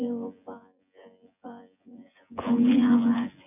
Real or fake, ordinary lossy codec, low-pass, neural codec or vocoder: fake; none; 3.6 kHz; vocoder, 24 kHz, 100 mel bands, Vocos